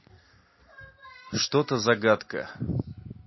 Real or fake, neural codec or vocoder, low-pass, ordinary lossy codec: real; none; 7.2 kHz; MP3, 24 kbps